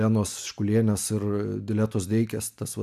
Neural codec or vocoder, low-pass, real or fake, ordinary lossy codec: none; 14.4 kHz; real; AAC, 96 kbps